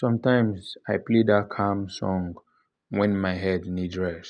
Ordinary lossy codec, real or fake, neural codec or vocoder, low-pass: none; real; none; 9.9 kHz